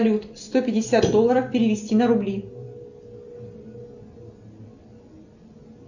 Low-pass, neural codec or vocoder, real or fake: 7.2 kHz; none; real